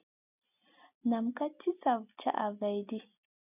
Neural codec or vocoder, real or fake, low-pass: none; real; 3.6 kHz